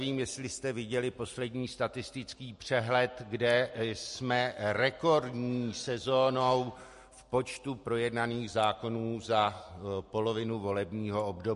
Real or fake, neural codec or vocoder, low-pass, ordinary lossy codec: real; none; 14.4 kHz; MP3, 48 kbps